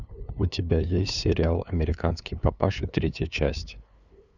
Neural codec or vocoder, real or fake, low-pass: codec, 16 kHz, 2 kbps, FunCodec, trained on LibriTTS, 25 frames a second; fake; 7.2 kHz